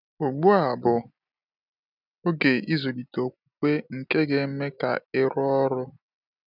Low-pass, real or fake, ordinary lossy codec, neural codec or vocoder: 5.4 kHz; real; none; none